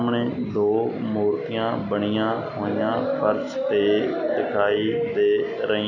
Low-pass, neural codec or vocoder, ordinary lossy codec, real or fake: 7.2 kHz; none; none; real